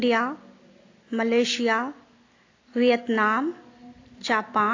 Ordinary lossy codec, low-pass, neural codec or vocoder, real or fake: AAC, 32 kbps; 7.2 kHz; none; real